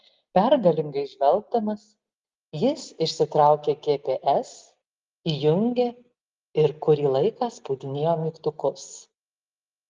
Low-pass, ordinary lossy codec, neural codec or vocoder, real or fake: 7.2 kHz; Opus, 32 kbps; none; real